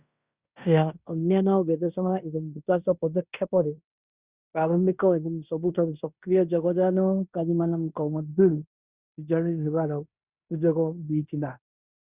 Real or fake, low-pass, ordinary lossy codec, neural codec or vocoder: fake; 3.6 kHz; Opus, 64 kbps; codec, 16 kHz in and 24 kHz out, 0.9 kbps, LongCat-Audio-Codec, fine tuned four codebook decoder